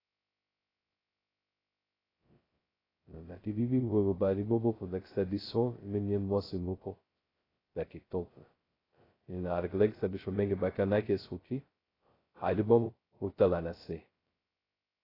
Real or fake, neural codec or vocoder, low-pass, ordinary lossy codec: fake; codec, 16 kHz, 0.2 kbps, FocalCodec; 5.4 kHz; AAC, 24 kbps